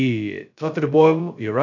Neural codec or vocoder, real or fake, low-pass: codec, 16 kHz, 0.2 kbps, FocalCodec; fake; 7.2 kHz